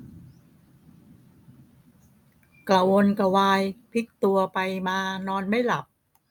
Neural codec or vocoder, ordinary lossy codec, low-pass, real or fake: none; none; none; real